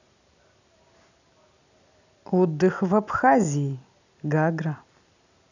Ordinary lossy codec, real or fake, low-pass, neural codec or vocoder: none; real; 7.2 kHz; none